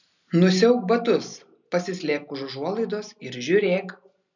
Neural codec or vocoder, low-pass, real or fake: none; 7.2 kHz; real